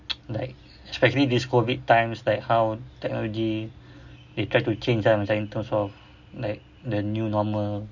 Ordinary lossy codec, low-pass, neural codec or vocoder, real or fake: none; 7.2 kHz; none; real